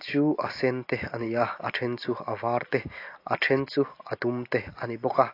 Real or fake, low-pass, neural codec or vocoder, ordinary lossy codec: real; 5.4 kHz; none; AAC, 32 kbps